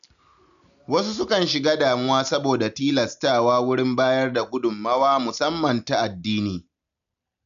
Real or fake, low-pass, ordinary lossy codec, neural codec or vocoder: real; 7.2 kHz; none; none